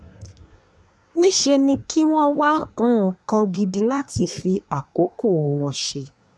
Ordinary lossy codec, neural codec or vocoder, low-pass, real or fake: none; codec, 24 kHz, 1 kbps, SNAC; none; fake